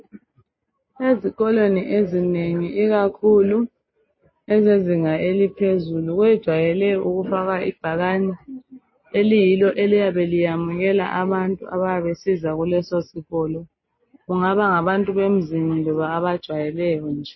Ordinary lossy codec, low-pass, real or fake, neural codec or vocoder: MP3, 24 kbps; 7.2 kHz; real; none